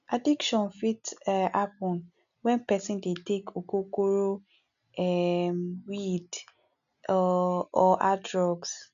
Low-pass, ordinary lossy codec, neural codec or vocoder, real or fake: 7.2 kHz; none; none; real